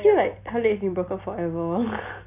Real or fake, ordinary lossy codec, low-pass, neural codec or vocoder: real; none; 3.6 kHz; none